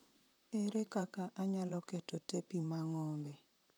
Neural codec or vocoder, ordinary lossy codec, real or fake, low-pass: vocoder, 44.1 kHz, 128 mel bands, Pupu-Vocoder; none; fake; none